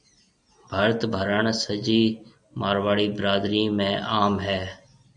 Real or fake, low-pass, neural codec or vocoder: real; 9.9 kHz; none